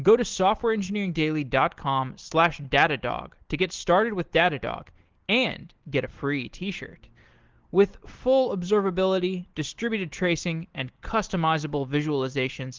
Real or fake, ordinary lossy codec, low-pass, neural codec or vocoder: real; Opus, 16 kbps; 7.2 kHz; none